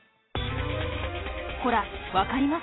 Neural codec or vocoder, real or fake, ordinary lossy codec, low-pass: none; real; AAC, 16 kbps; 7.2 kHz